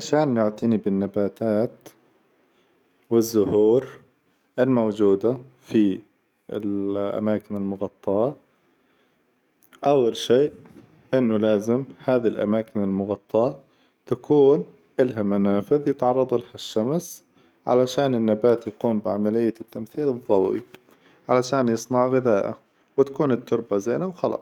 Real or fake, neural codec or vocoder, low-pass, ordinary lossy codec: fake; codec, 44.1 kHz, 7.8 kbps, DAC; 19.8 kHz; none